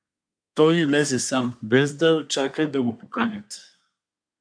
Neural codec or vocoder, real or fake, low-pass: codec, 24 kHz, 1 kbps, SNAC; fake; 9.9 kHz